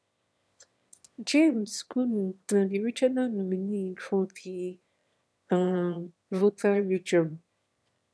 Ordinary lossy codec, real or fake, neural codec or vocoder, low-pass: none; fake; autoencoder, 22.05 kHz, a latent of 192 numbers a frame, VITS, trained on one speaker; none